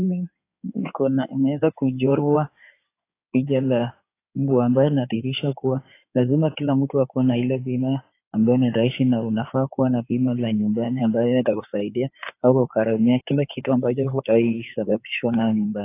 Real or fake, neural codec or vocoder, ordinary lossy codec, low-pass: fake; codec, 16 kHz in and 24 kHz out, 2.2 kbps, FireRedTTS-2 codec; AAC, 24 kbps; 3.6 kHz